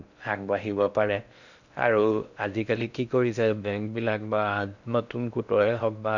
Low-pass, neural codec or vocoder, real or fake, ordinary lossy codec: 7.2 kHz; codec, 16 kHz in and 24 kHz out, 0.6 kbps, FocalCodec, streaming, 4096 codes; fake; none